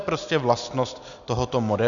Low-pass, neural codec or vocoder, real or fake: 7.2 kHz; none; real